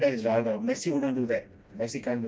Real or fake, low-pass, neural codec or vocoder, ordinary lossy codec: fake; none; codec, 16 kHz, 1 kbps, FreqCodec, smaller model; none